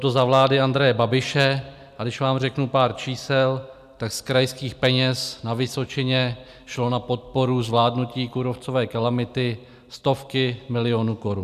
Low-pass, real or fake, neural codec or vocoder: 14.4 kHz; real; none